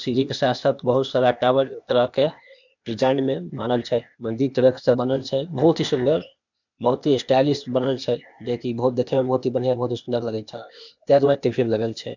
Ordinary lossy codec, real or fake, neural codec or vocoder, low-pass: none; fake; codec, 16 kHz, 0.8 kbps, ZipCodec; 7.2 kHz